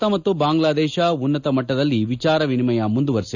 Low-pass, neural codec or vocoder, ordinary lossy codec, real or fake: 7.2 kHz; none; none; real